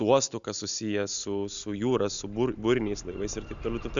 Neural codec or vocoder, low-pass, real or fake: none; 7.2 kHz; real